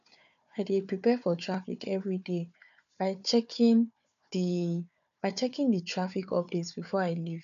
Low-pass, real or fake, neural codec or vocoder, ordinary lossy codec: 7.2 kHz; fake; codec, 16 kHz, 4 kbps, FunCodec, trained on Chinese and English, 50 frames a second; none